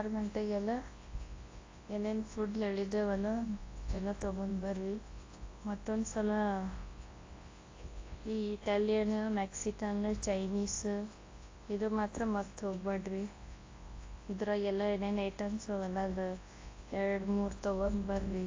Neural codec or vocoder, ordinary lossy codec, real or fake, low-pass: codec, 24 kHz, 0.9 kbps, WavTokenizer, large speech release; AAC, 32 kbps; fake; 7.2 kHz